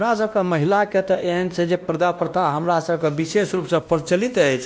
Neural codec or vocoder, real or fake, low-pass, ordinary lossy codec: codec, 16 kHz, 1 kbps, X-Codec, WavLM features, trained on Multilingual LibriSpeech; fake; none; none